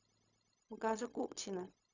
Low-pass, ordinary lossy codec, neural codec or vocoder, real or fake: 7.2 kHz; Opus, 64 kbps; codec, 16 kHz, 0.4 kbps, LongCat-Audio-Codec; fake